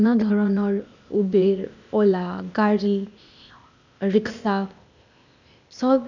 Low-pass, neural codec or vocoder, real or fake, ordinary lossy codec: 7.2 kHz; codec, 16 kHz, 0.8 kbps, ZipCodec; fake; none